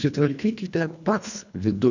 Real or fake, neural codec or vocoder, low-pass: fake; codec, 24 kHz, 1.5 kbps, HILCodec; 7.2 kHz